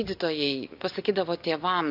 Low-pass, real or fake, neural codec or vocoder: 5.4 kHz; real; none